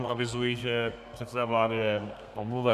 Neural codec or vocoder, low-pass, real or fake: codec, 32 kHz, 1.9 kbps, SNAC; 14.4 kHz; fake